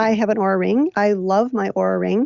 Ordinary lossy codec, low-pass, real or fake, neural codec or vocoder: Opus, 64 kbps; 7.2 kHz; real; none